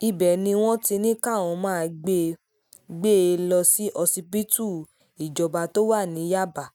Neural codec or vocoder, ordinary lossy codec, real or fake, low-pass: none; none; real; 19.8 kHz